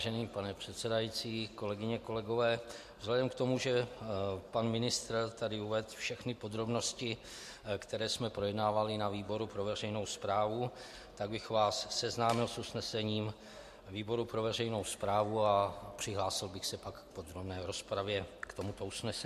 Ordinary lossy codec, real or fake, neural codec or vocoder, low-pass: MP3, 64 kbps; real; none; 14.4 kHz